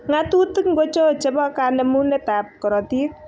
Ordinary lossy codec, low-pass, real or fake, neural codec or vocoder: none; none; real; none